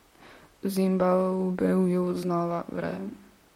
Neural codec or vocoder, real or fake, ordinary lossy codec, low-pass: vocoder, 44.1 kHz, 128 mel bands, Pupu-Vocoder; fake; MP3, 64 kbps; 19.8 kHz